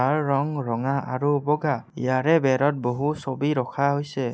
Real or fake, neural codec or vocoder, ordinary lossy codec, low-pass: real; none; none; none